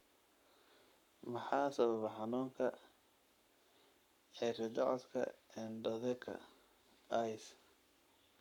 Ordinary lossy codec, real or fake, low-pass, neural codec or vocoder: none; fake; 19.8 kHz; codec, 44.1 kHz, 7.8 kbps, Pupu-Codec